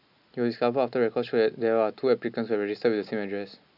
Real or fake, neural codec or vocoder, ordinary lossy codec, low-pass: real; none; none; 5.4 kHz